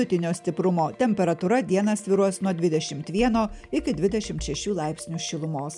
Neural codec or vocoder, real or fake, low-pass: none; real; 10.8 kHz